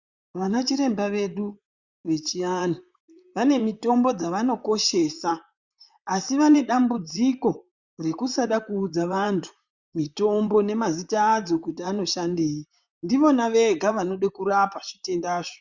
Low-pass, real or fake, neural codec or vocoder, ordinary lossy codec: 7.2 kHz; fake; vocoder, 44.1 kHz, 128 mel bands, Pupu-Vocoder; Opus, 64 kbps